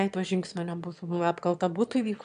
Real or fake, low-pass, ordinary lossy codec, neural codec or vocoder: fake; 9.9 kHz; AAC, 96 kbps; autoencoder, 22.05 kHz, a latent of 192 numbers a frame, VITS, trained on one speaker